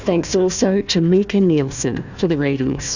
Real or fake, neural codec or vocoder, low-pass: fake; codec, 16 kHz, 1 kbps, FunCodec, trained on Chinese and English, 50 frames a second; 7.2 kHz